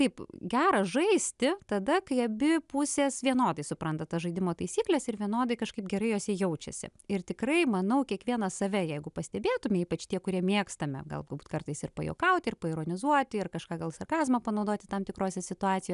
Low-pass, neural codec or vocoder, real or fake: 10.8 kHz; none; real